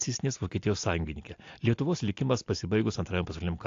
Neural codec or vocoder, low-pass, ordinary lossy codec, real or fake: none; 7.2 kHz; AAC, 48 kbps; real